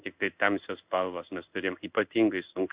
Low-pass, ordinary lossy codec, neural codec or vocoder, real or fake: 3.6 kHz; Opus, 64 kbps; codec, 16 kHz in and 24 kHz out, 1 kbps, XY-Tokenizer; fake